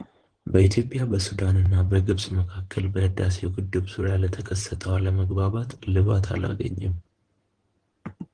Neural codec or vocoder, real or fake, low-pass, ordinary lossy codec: codec, 16 kHz in and 24 kHz out, 2.2 kbps, FireRedTTS-2 codec; fake; 9.9 kHz; Opus, 16 kbps